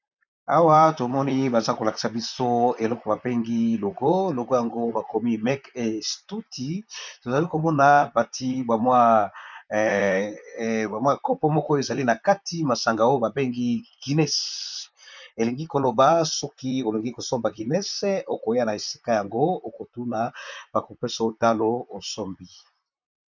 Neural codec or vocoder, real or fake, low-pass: vocoder, 44.1 kHz, 128 mel bands, Pupu-Vocoder; fake; 7.2 kHz